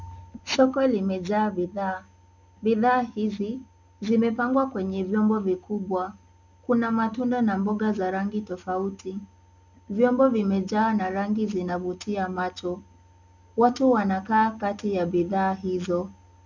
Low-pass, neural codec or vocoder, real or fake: 7.2 kHz; none; real